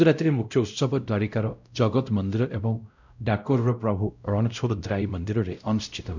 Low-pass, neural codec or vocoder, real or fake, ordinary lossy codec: 7.2 kHz; codec, 16 kHz, 0.5 kbps, X-Codec, WavLM features, trained on Multilingual LibriSpeech; fake; none